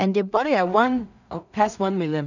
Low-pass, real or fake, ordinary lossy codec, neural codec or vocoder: 7.2 kHz; fake; none; codec, 16 kHz in and 24 kHz out, 0.4 kbps, LongCat-Audio-Codec, two codebook decoder